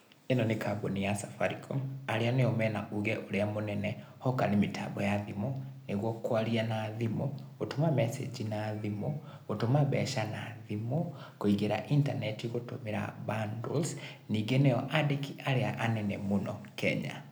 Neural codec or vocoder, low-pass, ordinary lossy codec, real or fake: none; none; none; real